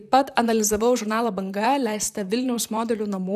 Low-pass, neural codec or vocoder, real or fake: 14.4 kHz; vocoder, 44.1 kHz, 128 mel bands, Pupu-Vocoder; fake